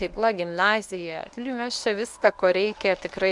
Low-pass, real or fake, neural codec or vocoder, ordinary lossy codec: 10.8 kHz; fake; codec, 24 kHz, 0.9 kbps, WavTokenizer, medium speech release version 1; MP3, 96 kbps